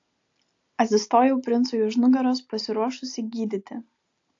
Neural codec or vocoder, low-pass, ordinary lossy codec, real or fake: none; 7.2 kHz; AAC, 48 kbps; real